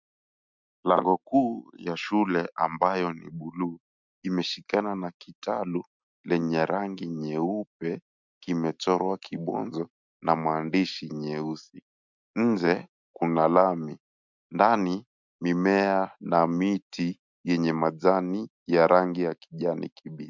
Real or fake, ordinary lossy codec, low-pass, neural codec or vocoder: real; MP3, 64 kbps; 7.2 kHz; none